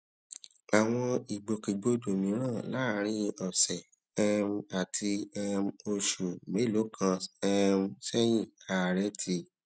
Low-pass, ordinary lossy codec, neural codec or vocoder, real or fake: none; none; none; real